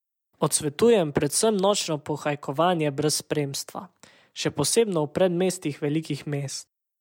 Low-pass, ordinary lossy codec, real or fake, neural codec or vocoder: 19.8 kHz; MP3, 96 kbps; real; none